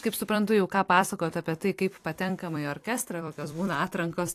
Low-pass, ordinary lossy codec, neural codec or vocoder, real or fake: 14.4 kHz; AAC, 64 kbps; vocoder, 44.1 kHz, 128 mel bands every 256 samples, BigVGAN v2; fake